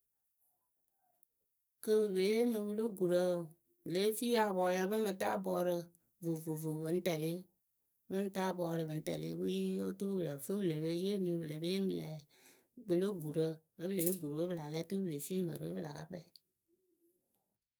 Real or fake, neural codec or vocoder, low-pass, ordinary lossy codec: fake; codec, 44.1 kHz, 2.6 kbps, SNAC; none; none